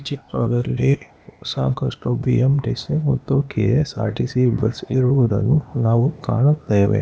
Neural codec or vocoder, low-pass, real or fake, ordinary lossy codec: codec, 16 kHz, 0.8 kbps, ZipCodec; none; fake; none